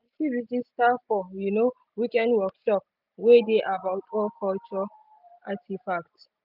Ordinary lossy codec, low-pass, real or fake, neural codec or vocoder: none; 5.4 kHz; real; none